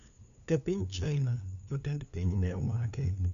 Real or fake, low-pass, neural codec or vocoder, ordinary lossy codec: fake; 7.2 kHz; codec, 16 kHz, 2 kbps, FunCodec, trained on LibriTTS, 25 frames a second; none